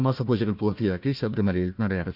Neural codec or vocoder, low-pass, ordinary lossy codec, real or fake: codec, 16 kHz, 1 kbps, FunCodec, trained on Chinese and English, 50 frames a second; 5.4 kHz; Opus, 64 kbps; fake